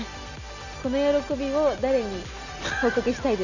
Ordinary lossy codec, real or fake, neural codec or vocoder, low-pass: none; real; none; 7.2 kHz